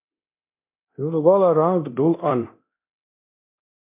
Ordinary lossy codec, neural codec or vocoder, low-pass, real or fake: MP3, 32 kbps; codec, 24 kHz, 0.9 kbps, DualCodec; 3.6 kHz; fake